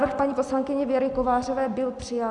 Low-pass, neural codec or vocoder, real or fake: 10.8 kHz; none; real